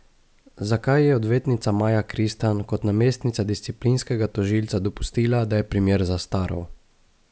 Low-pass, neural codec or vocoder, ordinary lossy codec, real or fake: none; none; none; real